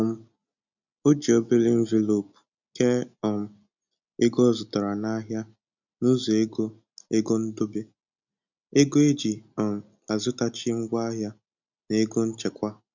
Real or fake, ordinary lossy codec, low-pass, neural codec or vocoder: real; none; 7.2 kHz; none